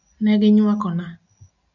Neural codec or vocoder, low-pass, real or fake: none; 7.2 kHz; real